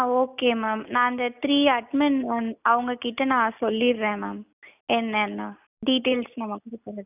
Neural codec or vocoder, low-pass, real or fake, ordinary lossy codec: none; 3.6 kHz; real; none